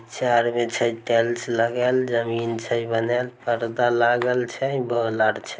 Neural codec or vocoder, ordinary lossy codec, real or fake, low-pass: none; none; real; none